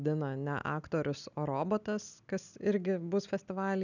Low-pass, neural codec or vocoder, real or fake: 7.2 kHz; none; real